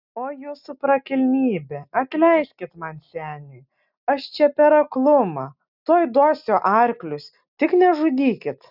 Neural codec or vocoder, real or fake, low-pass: none; real; 5.4 kHz